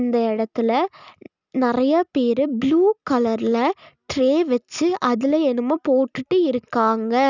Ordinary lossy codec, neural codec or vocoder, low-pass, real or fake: none; none; 7.2 kHz; real